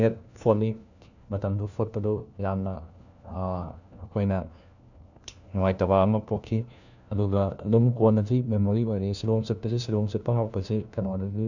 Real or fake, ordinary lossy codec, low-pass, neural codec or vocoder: fake; none; 7.2 kHz; codec, 16 kHz, 1 kbps, FunCodec, trained on LibriTTS, 50 frames a second